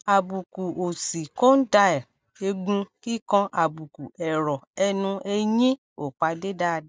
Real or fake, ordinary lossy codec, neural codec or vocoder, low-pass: real; none; none; none